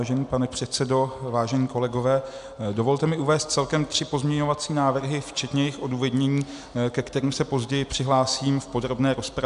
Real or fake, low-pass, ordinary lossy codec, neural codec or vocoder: real; 10.8 kHz; AAC, 96 kbps; none